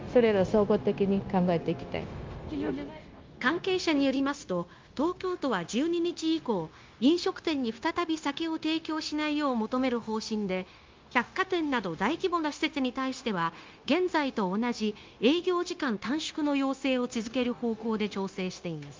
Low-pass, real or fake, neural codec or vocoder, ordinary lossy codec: 7.2 kHz; fake; codec, 16 kHz, 0.9 kbps, LongCat-Audio-Codec; Opus, 32 kbps